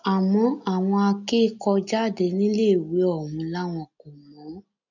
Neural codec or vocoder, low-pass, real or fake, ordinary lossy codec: none; 7.2 kHz; real; none